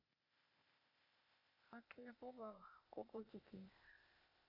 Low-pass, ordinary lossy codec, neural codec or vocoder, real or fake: 5.4 kHz; none; codec, 16 kHz, 0.8 kbps, ZipCodec; fake